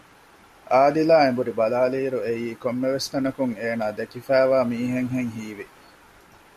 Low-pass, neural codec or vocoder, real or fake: 14.4 kHz; none; real